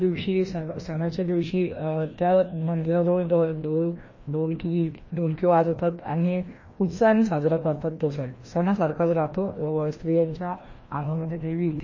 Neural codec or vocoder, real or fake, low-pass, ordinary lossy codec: codec, 16 kHz, 1 kbps, FreqCodec, larger model; fake; 7.2 kHz; MP3, 32 kbps